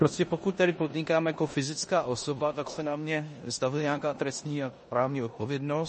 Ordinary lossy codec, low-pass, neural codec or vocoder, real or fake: MP3, 32 kbps; 10.8 kHz; codec, 16 kHz in and 24 kHz out, 0.9 kbps, LongCat-Audio-Codec, four codebook decoder; fake